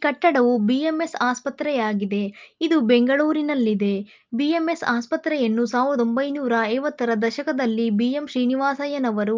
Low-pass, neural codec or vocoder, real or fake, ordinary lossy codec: 7.2 kHz; none; real; Opus, 24 kbps